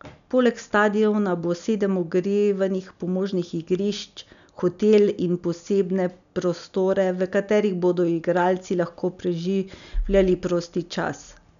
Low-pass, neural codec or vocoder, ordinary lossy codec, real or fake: 7.2 kHz; none; none; real